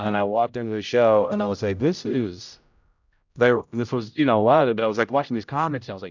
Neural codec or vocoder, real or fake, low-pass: codec, 16 kHz, 0.5 kbps, X-Codec, HuBERT features, trained on general audio; fake; 7.2 kHz